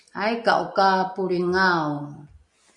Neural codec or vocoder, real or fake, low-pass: none; real; 10.8 kHz